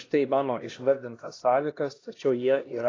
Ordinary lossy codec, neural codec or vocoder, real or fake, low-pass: AAC, 32 kbps; codec, 16 kHz, 1 kbps, X-Codec, HuBERT features, trained on LibriSpeech; fake; 7.2 kHz